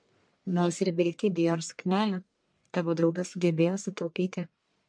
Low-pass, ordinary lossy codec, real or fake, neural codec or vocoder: 9.9 kHz; MP3, 64 kbps; fake; codec, 44.1 kHz, 1.7 kbps, Pupu-Codec